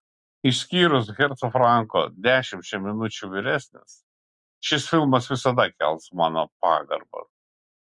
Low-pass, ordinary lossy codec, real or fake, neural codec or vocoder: 10.8 kHz; MP3, 64 kbps; real; none